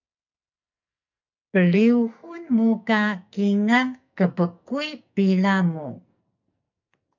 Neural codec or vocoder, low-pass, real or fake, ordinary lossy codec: codec, 44.1 kHz, 2.6 kbps, SNAC; 7.2 kHz; fake; MP3, 64 kbps